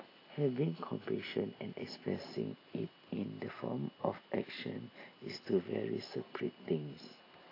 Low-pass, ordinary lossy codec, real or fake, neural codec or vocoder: 5.4 kHz; AAC, 24 kbps; real; none